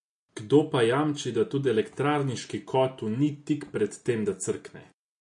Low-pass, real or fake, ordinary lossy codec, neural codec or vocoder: 10.8 kHz; real; MP3, 48 kbps; none